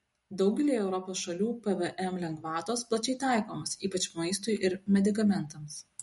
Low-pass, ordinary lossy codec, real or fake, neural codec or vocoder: 19.8 kHz; MP3, 48 kbps; real; none